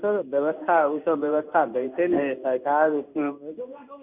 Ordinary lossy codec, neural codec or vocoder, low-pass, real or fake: none; codec, 16 kHz in and 24 kHz out, 1 kbps, XY-Tokenizer; 3.6 kHz; fake